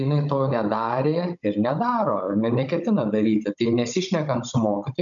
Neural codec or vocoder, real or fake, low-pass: codec, 16 kHz, 16 kbps, FunCodec, trained on Chinese and English, 50 frames a second; fake; 7.2 kHz